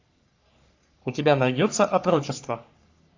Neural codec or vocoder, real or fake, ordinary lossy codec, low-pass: codec, 44.1 kHz, 3.4 kbps, Pupu-Codec; fake; AAC, 48 kbps; 7.2 kHz